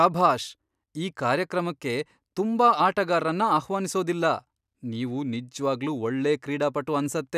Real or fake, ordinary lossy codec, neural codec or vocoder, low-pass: real; none; none; 14.4 kHz